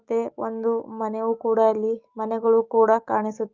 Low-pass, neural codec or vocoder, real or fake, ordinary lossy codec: 7.2 kHz; autoencoder, 48 kHz, 128 numbers a frame, DAC-VAE, trained on Japanese speech; fake; Opus, 32 kbps